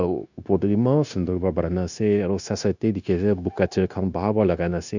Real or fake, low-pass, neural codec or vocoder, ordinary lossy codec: fake; 7.2 kHz; codec, 16 kHz, 0.9 kbps, LongCat-Audio-Codec; none